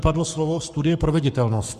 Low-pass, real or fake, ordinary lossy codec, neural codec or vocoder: 14.4 kHz; fake; Opus, 64 kbps; codec, 44.1 kHz, 7.8 kbps, Pupu-Codec